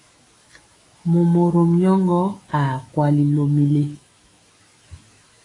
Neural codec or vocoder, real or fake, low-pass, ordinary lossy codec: autoencoder, 48 kHz, 128 numbers a frame, DAC-VAE, trained on Japanese speech; fake; 10.8 kHz; AAC, 32 kbps